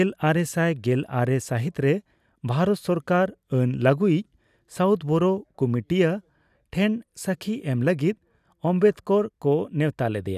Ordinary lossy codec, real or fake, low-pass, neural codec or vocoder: none; real; 14.4 kHz; none